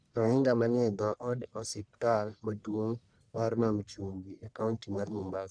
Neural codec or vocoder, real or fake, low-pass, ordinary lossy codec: codec, 44.1 kHz, 1.7 kbps, Pupu-Codec; fake; 9.9 kHz; none